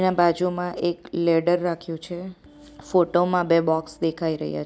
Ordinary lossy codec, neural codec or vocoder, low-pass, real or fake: none; none; none; real